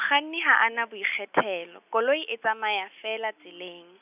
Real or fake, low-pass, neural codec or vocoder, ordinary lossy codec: real; 3.6 kHz; none; none